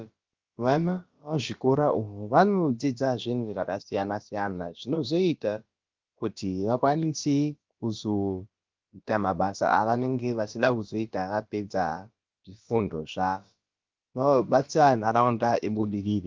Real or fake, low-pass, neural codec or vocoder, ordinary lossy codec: fake; 7.2 kHz; codec, 16 kHz, about 1 kbps, DyCAST, with the encoder's durations; Opus, 32 kbps